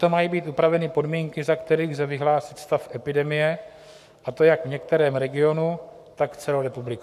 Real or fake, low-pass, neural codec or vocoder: fake; 14.4 kHz; codec, 44.1 kHz, 7.8 kbps, Pupu-Codec